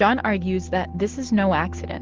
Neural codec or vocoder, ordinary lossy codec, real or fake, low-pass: none; Opus, 16 kbps; real; 7.2 kHz